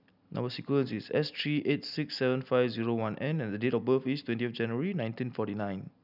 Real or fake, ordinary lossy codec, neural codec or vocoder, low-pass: real; none; none; 5.4 kHz